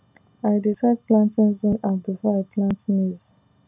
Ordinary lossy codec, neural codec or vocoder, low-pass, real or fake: none; none; 3.6 kHz; real